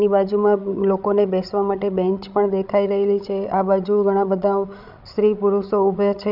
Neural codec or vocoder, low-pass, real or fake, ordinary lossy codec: codec, 16 kHz, 16 kbps, FreqCodec, larger model; 5.4 kHz; fake; none